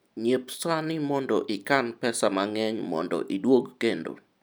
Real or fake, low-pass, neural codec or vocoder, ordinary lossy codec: real; none; none; none